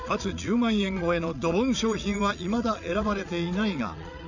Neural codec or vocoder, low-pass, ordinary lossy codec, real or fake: vocoder, 22.05 kHz, 80 mel bands, Vocos; 7.2 kHz; none; fake